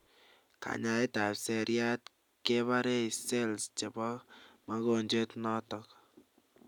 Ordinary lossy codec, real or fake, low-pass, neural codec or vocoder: none; real; 19.8 kHz; none